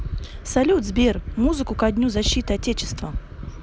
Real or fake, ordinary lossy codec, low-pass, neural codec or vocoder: real; none; none; none